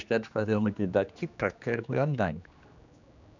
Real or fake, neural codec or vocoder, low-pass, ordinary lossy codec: fake; codec, 16 kHz, 2 kbps, X-Codec, HuBERT features, trained on general audio; 7.2 kHz; none